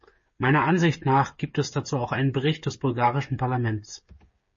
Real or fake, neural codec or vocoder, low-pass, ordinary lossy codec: fake; codec, 16 kHz, 8 kbps, FreqCodec, smaller model; 7.2 kHz; MP3, 32 kbps